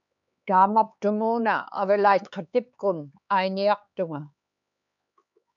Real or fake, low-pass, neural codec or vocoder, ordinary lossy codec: fake; 7.2 kHz; codec, 16 kHz, 4 kbps, X-Codec, HuBERT features, trained on LibriSpeech; MP3, 96 kbps